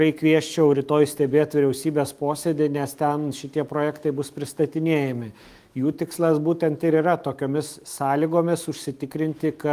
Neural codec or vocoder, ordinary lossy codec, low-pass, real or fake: autoencoder, 48 kHz, 128 numbers a frame, DAC-VAE, trained on Japanese speech; Opus, 32 kbps; 14.4 kHz; fake